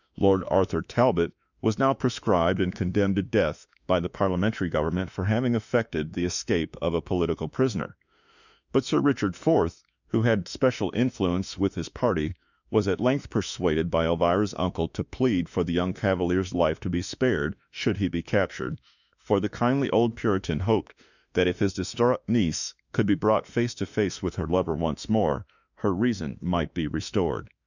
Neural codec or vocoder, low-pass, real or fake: autoencoder, 48 kHz, 32 numbers a frame, DAC-VAE, trained on Japanese speech; 7.2 kHz; fake